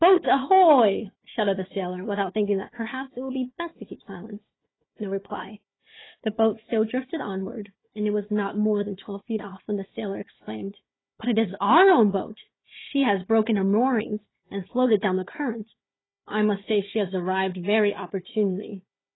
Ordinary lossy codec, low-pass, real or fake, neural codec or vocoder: AAC, 16 kbps; 7.2 kHz; fake; codec, 16 kHz, 4 kbps, FunCodec, trained on Chinese and English, 50 frames a second